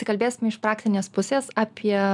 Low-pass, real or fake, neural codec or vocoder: 10.8 kHz; real; none